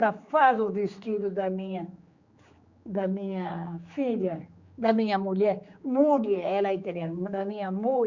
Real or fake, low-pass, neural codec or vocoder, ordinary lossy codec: fake; 7.2 kHz; codec, 16 kHz, 4 kbps, X-Codec, HuBERT features, trained on general audio; Opus, 64 kbps